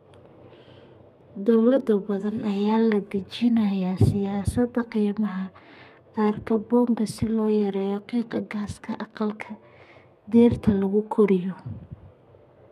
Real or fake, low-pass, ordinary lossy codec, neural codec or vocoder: fake; 14.4 kHz; none; codec, 32 kHz, 1.9 kbps, SNAC